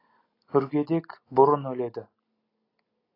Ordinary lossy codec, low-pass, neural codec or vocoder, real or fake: AAC, 24 kbps; 5.4 kHz; none; real